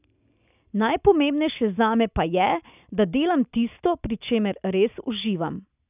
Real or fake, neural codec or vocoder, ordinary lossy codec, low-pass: real; none; none; 3.6 kHz